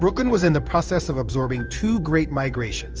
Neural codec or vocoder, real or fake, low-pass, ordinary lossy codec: codec, 16 kHz in and 24 kHz out, 1 kbps, XY-Tokenizer; fake; 7.2 kHz; Opus, 24 kbps